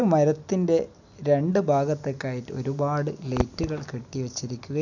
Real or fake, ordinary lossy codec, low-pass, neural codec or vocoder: real; none; 7.2 kHz; none